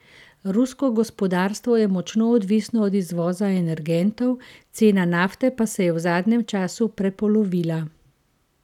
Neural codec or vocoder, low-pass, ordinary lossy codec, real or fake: none; 19.8 kHz; none; real